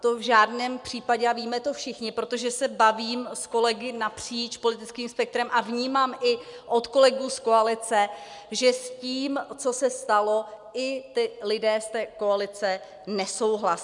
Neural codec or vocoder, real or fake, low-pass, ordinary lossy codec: none; real; 10.8 kHz; MP3, 96 kbps